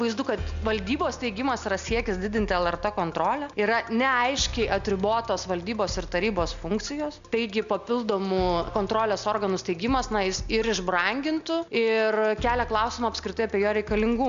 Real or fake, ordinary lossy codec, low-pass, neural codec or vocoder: real; MP3, 64 kbps; 7.2 kHz; none